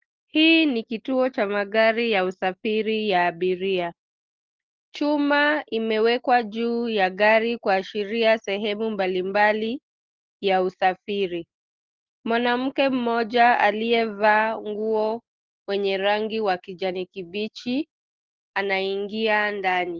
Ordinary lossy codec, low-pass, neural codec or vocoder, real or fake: Opus, 16 kbps; 7.2 kHz; none; real